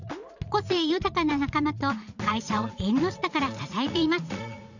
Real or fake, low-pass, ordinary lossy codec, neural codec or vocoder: fake; 7.2 kHz; none; vocoder, 44.1 kHz, 80 mel bands, Vocos